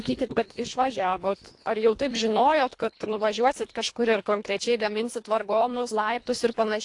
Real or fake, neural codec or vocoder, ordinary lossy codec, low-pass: fake; codec, 24 kHz, 1.5 kbps, HILCodec; AAC, 48 kbps; 10.8 kHz